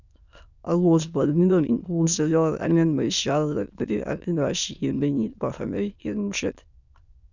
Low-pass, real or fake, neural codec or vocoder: 7.2 kHz; fake; autoencoder, 22.05 kHz, a latent of 192 numbers a frame, VITS, trained on many speakers